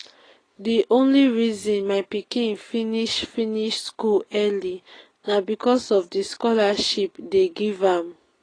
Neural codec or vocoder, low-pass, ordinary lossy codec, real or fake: none; 9.9 kHz; AAC, 32 kbps; real